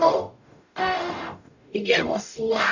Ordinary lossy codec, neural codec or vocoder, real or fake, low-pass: none; codec, 44.1 kHz, 0.9 kbps, DAC; fake; 7.2 kHz